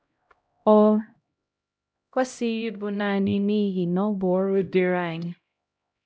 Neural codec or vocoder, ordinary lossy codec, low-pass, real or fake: codec, 16 kHz, 0.5 kbps, X-Codec, HuBERT features, trained on LibriSpeech; none; none; fake